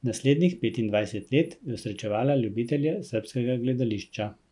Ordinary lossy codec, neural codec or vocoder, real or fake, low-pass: none; none; real; 10.8 kHz